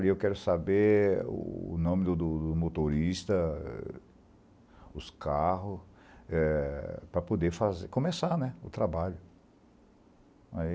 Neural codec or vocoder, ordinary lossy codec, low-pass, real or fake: none; none; none; real